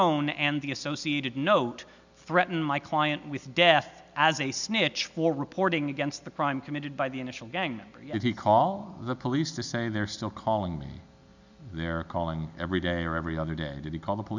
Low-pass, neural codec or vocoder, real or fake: 7.2 kHz; none; real